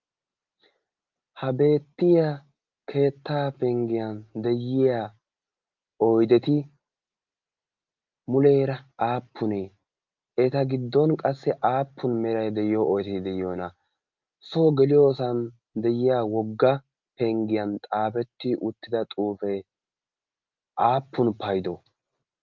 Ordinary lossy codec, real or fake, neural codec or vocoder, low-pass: Opus, 24 kbps; real; none; 7.2 kHz